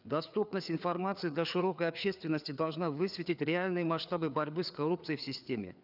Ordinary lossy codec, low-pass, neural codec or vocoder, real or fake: none; 5.4 kHz; codec, 16 kHz, 4 kbps, FreqCodec, larger model; fake